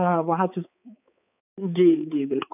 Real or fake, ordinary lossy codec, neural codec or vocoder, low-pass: fake; none; codec, 16 kHz, 4 kbps, X-Codec, HuBERT features, trained on balanced general audio; 3.6 kHz